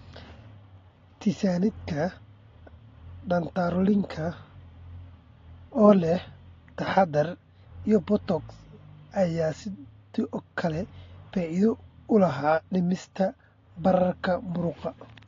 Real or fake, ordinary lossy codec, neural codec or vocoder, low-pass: real; AAC, 32 kbps; none; 7.2 kHz